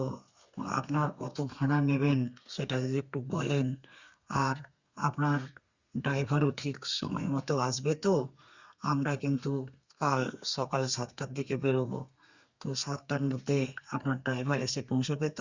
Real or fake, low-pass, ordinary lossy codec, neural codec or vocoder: fake; 7.2 kHz; Opus, 64 kbps; codec, 32 kHz, 1.9 kbps, SNAC